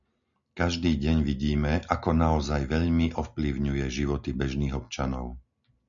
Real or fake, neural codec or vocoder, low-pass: real; none; 7.2 kHz